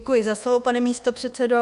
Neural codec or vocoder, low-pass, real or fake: codec, 24 kHz, 1.2 kbps, DualCodec; 10.8 kHz; fake